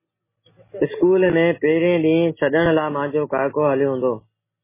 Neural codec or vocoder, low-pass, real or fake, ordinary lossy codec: none; 3.6 kHz; real; MP3, 16 kbps